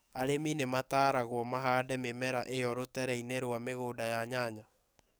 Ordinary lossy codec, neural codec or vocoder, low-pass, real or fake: none; codec, 44.1 kHz, 7.8 kbps, DAC; none; fake